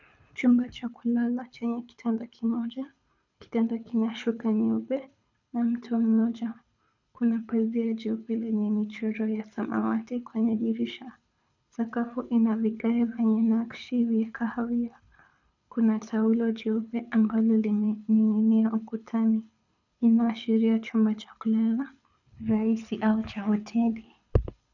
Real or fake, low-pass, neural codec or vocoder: fake; 7.2 kHz; codec, 24 kHz, 6 kbps, HILCodec